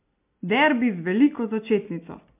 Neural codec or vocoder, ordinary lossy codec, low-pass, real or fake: none; MP3, 32 kbps; 3.6 kHz; real